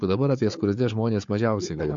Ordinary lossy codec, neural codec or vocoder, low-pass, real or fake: MP3, 48 kbps; codec, 16 kHz, 4 kbps, FunCodec, trained on Chinese and English, 50 frames a second; 7.2 kHz; fake